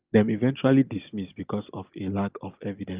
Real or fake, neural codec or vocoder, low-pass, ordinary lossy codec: real; none; 3.6 kHz; Opus, 32 kbps